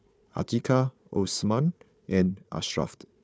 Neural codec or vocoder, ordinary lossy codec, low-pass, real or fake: codec, 16 kHz, 4 kbps, FunCodec, trained on Chinese and English, 50 frames a second; none; none; fake